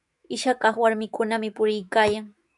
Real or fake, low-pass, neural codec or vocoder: fake; 10.8 kHz; autoencoder, 48 kHz, 128 numbers a frame, DAC-VAE, trained on Japanese speech